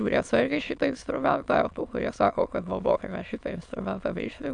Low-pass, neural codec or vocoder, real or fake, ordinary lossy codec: 9.9 kHz; autoencoder, 22.05 kHz, a latent of 192 numbers a frame, VITS, trained on many speakers; fake; Opus, 64 kbps